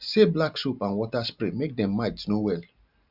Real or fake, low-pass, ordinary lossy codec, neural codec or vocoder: real; 5.4 kHz; none; none